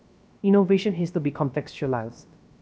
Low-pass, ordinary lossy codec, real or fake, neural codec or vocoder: none; none; fake; codec, 16 kHz, 0.3 kbps, FocalCodec